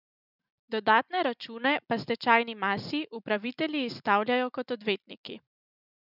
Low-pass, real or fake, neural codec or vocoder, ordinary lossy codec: 5.4 kHz; real; none; none